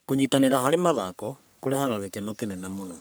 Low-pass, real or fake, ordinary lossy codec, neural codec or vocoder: none; fake; none; codec, 44.1 kHz, 3.4 kbps, Pupu-Codec